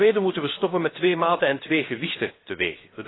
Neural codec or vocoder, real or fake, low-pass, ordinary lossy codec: codec, 16 kHz, 0.7 kbps, FocalCodec; fake; 7.2 kHz; AAC, 16 kbps